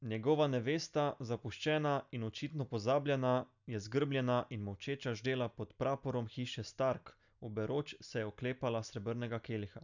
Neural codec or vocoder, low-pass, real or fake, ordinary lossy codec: none; 7.2 kHz; real; none